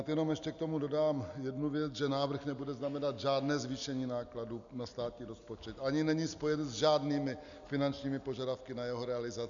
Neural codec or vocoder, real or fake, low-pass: none; real; 7.2 kHz